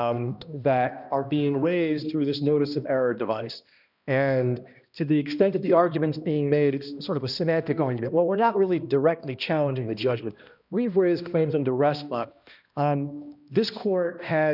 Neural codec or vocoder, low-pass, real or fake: codec, 16 kHz, 1 kbps, X-Codec, HuBERT features, trained on balanced general audio; 5.4 kHz; fake